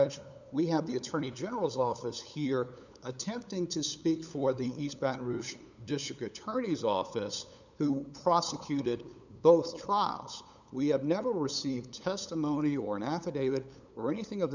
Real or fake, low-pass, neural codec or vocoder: fake; 7.2 kHz; codec, 16 kHz, 8 kbps, FunCodec, trained on LibriTTS, 25 frames a second